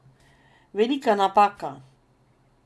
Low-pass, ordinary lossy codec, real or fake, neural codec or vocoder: none; none; real; none